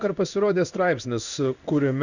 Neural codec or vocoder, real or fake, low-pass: codec, 16 kHz in and 24 kHz out, 1 kbps, XY-Tokenizer; fake; 7.2 kHz